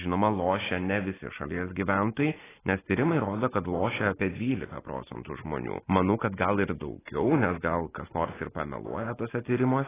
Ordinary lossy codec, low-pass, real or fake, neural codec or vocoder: AAC, 16 kbps; 3.6 kHz; real; none